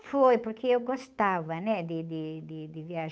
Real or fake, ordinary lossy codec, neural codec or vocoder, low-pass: fake; none; codec, 16 kHz, 8 kbps, FunCodec, trained on Chinese and English, 25 frames a second; none